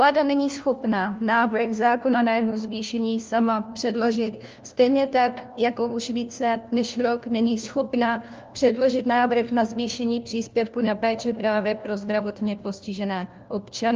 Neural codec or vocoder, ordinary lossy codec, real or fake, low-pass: codec, 16 kHz, 1 kbps, FunCodec, trained on LibriTTS, 50 frames a second; Opus, 24 kbps; fake; 7.2 kHz